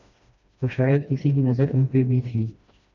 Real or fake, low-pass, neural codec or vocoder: fake; 7.2 kHz; codec, 16 kHz, 1 kbps, FreqCodec, smaller model